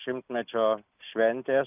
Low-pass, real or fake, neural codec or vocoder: 3.6 kHz; real; none